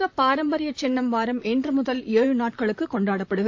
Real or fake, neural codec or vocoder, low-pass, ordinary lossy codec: fake; vocoder, 44.1 kHz, 128 mel bands, Pupu-Vocoder; 7.2 kHz; AAC, 48 kbps